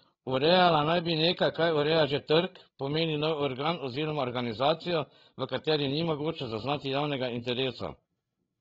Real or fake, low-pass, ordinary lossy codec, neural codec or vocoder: fake; 7.2 kHz; AAC, 24 kbps; codec, 16 kHz, 8 kbps, FreqCodec, larger model